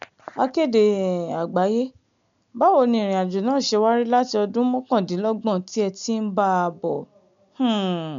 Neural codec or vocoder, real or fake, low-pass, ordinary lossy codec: none; real; 7.2 kHz; MP3, 64 kbps